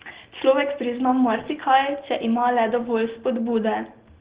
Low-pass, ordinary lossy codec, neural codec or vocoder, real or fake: 3.6 kHz; Opus, 16 kbps; none; real